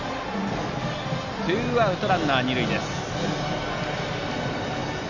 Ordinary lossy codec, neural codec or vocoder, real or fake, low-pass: Opus, 64 kbps; none; real; 7.2 kHz